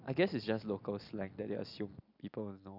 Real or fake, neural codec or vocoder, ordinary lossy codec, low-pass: real; none; none; 5.4 kHz